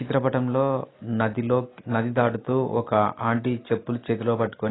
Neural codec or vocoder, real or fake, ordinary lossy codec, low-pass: none; real; AAC, 16 kbps; 7.2 kHz